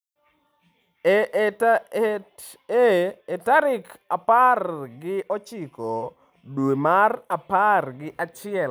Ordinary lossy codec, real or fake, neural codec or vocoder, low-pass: none; real; none; none